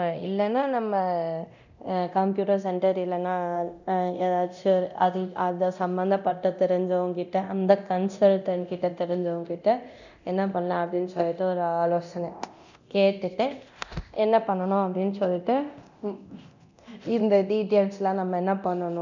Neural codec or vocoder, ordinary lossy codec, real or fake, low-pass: codec, 24 kHz, 0.9 kbps, DualCodec; none; fake; 7.2 kHz